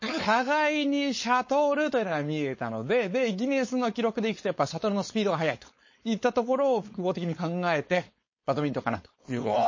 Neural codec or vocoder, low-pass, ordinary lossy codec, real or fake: codec, 16 kHz, 4.8 kbps, FACodec; 7.2 kHz; MP3, 32 kbps; fake